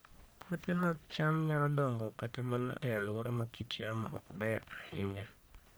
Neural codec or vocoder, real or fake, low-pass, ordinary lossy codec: codec, 44.1 kHz, 1.7 kbps, Pupu-Codec; fake; none; none